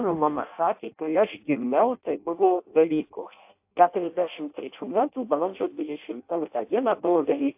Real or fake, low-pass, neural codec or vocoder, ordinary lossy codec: fake; 3.6 kHz; codec, 16 kHz in and 24 kHz out, 0.6 kbps, FireRedTTS-2 codec; AAC, 32 kbps